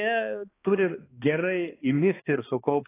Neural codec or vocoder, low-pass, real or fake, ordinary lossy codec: codec, 16 kHz, 2 kbps, X-Codec, HuBERT features, trained on balanced general audio; 3.6 kHz; fake; AAC, 16 kbps